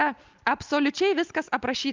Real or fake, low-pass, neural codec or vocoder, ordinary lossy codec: real; 7.2 kHz; none; Opus, 24 kbps